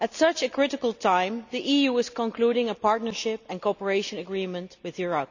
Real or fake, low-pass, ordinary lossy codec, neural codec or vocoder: real; 7.2 kHz; none; none